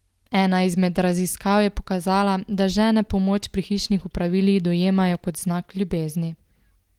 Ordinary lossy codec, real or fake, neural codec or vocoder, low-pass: Opus, 24 kbps; real; none; 19.8 kHz